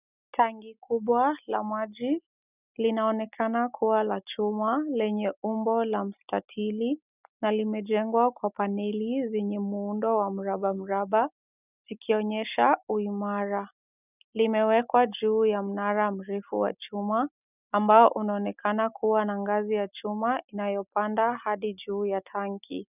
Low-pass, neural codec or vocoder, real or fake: 3.6 kHz; none; real